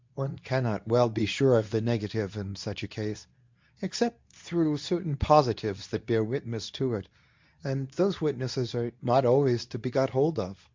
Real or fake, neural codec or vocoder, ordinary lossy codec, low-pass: fake; codec, 24 kHz, 0.9 kbps, WavTokenizer, medium speech release version 2; MP3, 64 kbps; 7.2 kHz